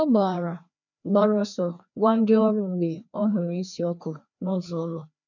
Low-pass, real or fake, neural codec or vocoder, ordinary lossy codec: 7.2 kHz; fake; codec, 16 kHz, 2 kbps, FreqCodec, larger model; none